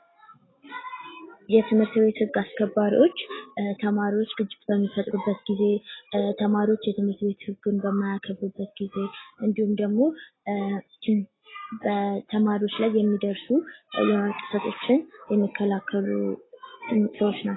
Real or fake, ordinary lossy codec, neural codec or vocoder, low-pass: real; AAC, 16 kbps; none; 7.2 kHz